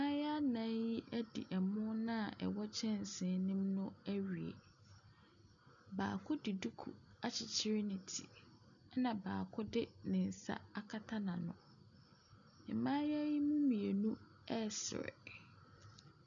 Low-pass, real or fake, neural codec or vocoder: 7.2 kHz; real; none